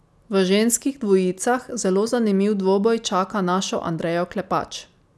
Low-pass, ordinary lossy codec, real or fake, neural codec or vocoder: none; none; real; none